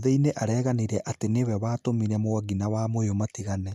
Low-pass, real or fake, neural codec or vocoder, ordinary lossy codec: 14.4 kHz; real; none; none